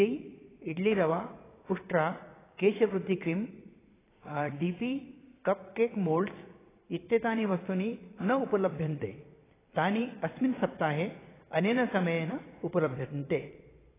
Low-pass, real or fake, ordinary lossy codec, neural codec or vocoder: 3.6 kHz; fake; AAC, 16 kbps; vocoder, 22.05 kHz, 80 mel bands, Vocos